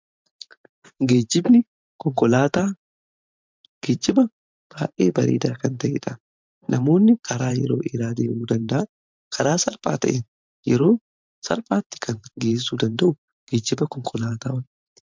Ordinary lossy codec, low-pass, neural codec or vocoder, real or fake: MP3, 64 kbps; 7.2 kHz; vocoder, 44.1 kHz, 128 mel bands every 512 samples, BigVGAN v2; fake